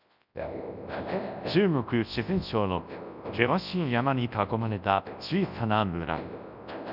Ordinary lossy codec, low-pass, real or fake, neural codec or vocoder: none; 5.4 kHz; fake; codec, 24 kHz, 0.9 kbps, WavTokenizer, large speech release